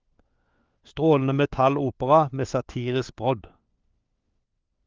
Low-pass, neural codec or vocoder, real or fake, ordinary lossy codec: 7.2 kHz; codec, 16 kHz, 4 kbps, FunCodec, trained on LibriTTS, 50 frames a second; fake; Opus, 32 kbps